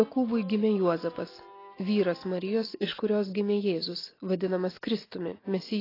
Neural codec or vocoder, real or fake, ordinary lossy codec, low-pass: none; real; AAC, 24 kbps; 5.4 kHz